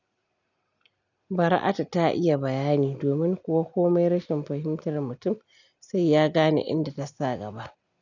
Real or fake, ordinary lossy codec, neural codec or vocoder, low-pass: real; none; none; 7.2 kHz